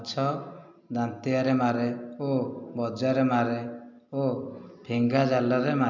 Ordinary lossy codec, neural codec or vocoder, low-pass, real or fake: MP3, 64 kbps; none; 7.2 kHz; real